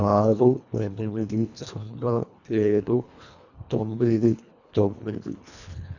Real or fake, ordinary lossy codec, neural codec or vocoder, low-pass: fake; none; codec, 24 kHz, 1.5 kbps, HILCodec; 7.2 kHz